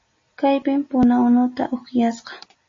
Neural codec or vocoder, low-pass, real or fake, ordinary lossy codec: none; 7.2 kHz; real; MP3, 32 kbps